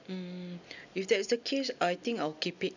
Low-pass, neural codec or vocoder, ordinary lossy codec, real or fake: 7.2 kHz; none; none; real